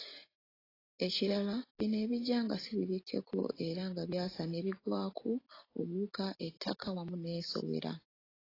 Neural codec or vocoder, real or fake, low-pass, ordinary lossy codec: none; real; 5.4 kHz; AAC, 24 kbps